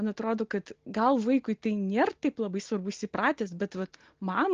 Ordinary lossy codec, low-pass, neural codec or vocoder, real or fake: Opus, 16 kbps; 7.2 kHz; none; real